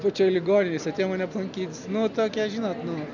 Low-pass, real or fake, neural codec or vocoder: 7.2 kHz; real; none